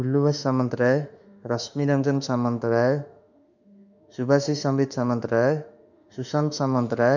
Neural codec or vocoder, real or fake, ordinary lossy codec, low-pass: autoencoder, 48 kHz, 32 numbers a frame, DAC-VAE, trained on Japanese speech; fake; none; 7.2 kHz